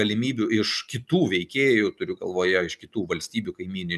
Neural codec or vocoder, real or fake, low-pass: none; real; 14.4 kHz